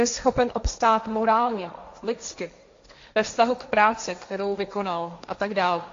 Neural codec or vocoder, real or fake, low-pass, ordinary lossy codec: codec, 16 kHz, 1.1 kbps, Voila-Tokenizer; fake; 7.2 kHz; AAC, 48 kbps